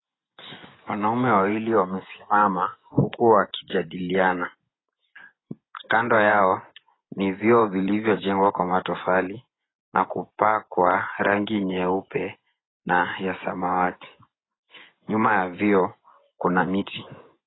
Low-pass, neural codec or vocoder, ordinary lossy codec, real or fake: 7.2 kHz; vocoder, 24 kHz, 100 mel bands, Vocos; AAC, 16 kbps; fake